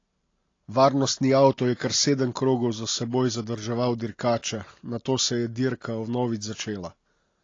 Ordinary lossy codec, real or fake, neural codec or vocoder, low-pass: AAC, 32 kbps; real; none; 7.2 kHz